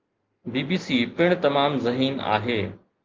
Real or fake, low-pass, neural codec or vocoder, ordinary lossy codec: real; 7.2 kHz; none; Opus, 32 kbps